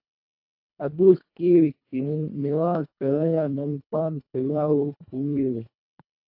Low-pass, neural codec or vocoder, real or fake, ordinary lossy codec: 5.4 kHz; codec, 24 kHz, 1.5 kbps, HILCodec; fake; AAC, 48 kbps